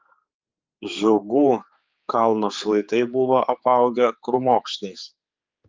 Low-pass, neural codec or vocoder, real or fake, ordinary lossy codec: 7.2 kHz; codec, 16 kHz, 4 kbps, X-Codec, HuBERT features, trained on general audio; fake; Opus, 24 kbps